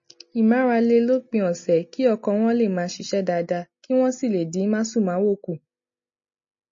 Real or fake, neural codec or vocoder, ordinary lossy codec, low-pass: real; none; MP3, 32 kbps; 7.2 kHz